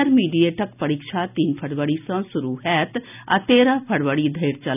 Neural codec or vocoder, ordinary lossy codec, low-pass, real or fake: none; none; 3.6 kHz; real